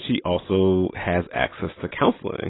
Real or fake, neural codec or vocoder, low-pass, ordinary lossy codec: real; none; 7.2 kHz; AAC, 16 kbps